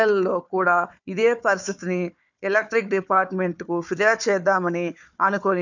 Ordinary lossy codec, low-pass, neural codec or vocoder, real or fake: none; 7.2 kHz; codec, 16 kHz, 4 kbps, FunCodec, trained on Chinese and English, 50 frames a second; fake